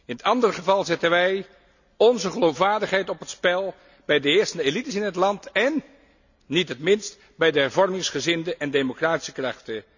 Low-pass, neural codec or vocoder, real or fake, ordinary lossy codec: 7.2 kHz; none; real; none